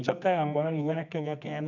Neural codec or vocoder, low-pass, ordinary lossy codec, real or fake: codec, 24 kHz, 0.9 kbps, WavTokenizer, medium music audio release; 7.2 kHz; none; fake